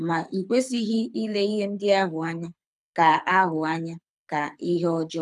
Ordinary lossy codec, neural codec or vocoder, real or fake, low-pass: none; codec, 24 kHz, 6 kbps, HILCodec; fake; none